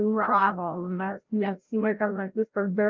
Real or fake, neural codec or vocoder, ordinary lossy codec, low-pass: fake; codec, 16 kHz, 0.5 kbps, FreqCodec, larger model; Opus, 24 kbps; 7.2 kHz